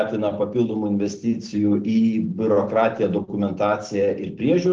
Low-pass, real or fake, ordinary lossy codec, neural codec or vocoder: 7.2 kHz; real; Opus, 16 kbps; none